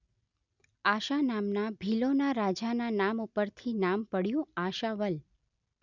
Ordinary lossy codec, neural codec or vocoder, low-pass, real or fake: none; none; 7.2 kHz; real